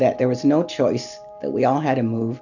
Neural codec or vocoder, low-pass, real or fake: none; 7.2 kHz; real